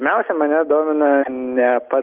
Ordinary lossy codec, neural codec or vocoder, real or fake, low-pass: Opus, 32 kbps; none; real; 3.6 kHz